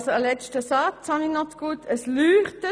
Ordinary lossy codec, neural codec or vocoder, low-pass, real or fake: none; none; none; real